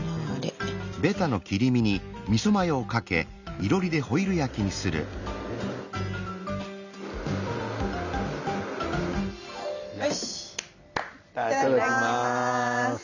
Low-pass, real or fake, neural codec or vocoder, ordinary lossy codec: 7.2 kHz; real; none; none